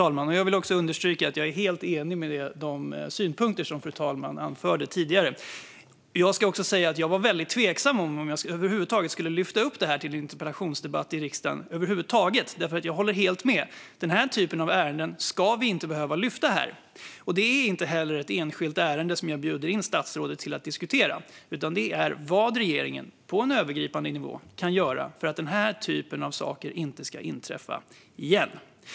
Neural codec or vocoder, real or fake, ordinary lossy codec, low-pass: none; real; none; none